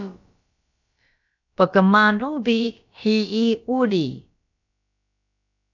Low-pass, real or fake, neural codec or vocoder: 7.2 kHz; fake; codec, 16 kHz, about 1 kbps, DyCAST, with the encoder's durations